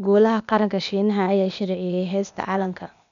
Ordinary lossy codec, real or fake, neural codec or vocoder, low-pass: none; fake; codec, 16 kHz, 0.8 kbps, ZipCodec; 7.2 kHz